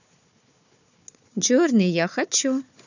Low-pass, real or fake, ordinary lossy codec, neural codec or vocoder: 7.2 kHz; fake; none; codec, 16 kHz, 4 kbps, FunCodec, trained on Chinese and English, 50 frames a second